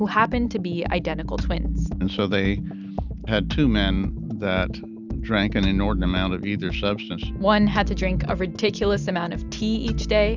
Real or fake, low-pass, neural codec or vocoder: real; 7.2 kHz; none